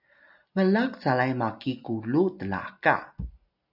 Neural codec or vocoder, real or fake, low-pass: none; real; 5.4 kHz